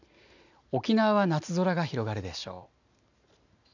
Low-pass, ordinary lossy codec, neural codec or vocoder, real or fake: 7.2 kHz; none; none; real